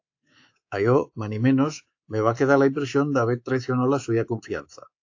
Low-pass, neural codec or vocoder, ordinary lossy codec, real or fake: 7.2 kHz; codec, 24 kHz, 3.1 kbps, DualCodec; AAC, 48 kbps; fake